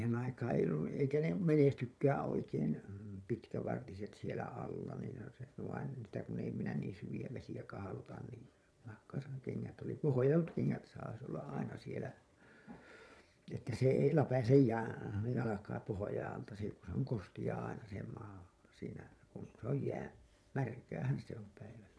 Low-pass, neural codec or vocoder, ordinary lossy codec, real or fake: none; vocoder, 22.05 kHz, 80 mel bands, Vocos; none; fake